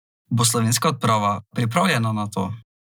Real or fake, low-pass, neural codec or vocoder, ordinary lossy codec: real; none; none; none